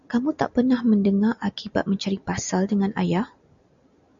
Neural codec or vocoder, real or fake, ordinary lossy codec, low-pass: none; real; MP3, 96 kbps; 7.2 kHz